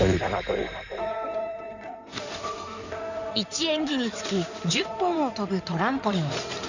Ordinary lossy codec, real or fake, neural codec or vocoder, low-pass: none; fake; codec, 16 kHz in and 24 kHz out, 2.2 kbps, FireRedTTS-2 codec; 7.2 kHz